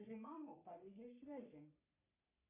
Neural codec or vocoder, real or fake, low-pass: codec, 44.1 kHz, 3.4 kbps, Pupu-Codec; fake; 3.6 kHz